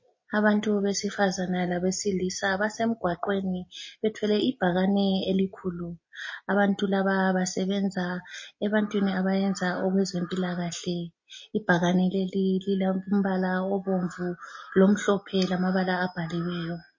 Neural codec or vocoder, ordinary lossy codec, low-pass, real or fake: none; MP3, 32 kbps; 7.2 kHz; real